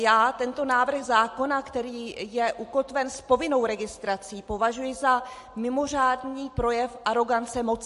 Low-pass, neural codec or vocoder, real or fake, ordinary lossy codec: 14.4 kHz; none; real; MP3, 48 kbps